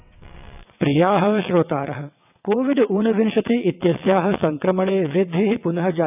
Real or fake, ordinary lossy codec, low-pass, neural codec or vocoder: fake; none; 3.6 kHz; vocoder, 22.05 kHz, 80 mel bands, WaveNeXt